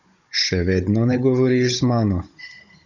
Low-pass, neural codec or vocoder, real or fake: 7.2 kHz; codec, 16 kHz, 16 kbps, FunCodec, trained on Chinese and English, 50 frames a second; fake